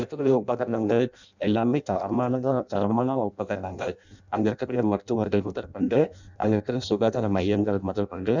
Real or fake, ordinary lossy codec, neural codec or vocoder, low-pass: fake; none; codec, 16 kHz in and 24 kHz out, 0.6 kbps, FireRedTTS-2 codec; 7.2 kHz